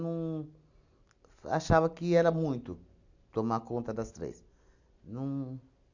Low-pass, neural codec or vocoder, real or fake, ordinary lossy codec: 7.2 kHz; none; real; none